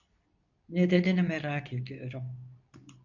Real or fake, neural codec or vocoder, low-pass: fake; codec, 24 kHz, 0.9 kbps, WavTokenizer, medium speech release version 2; 7.2 kHz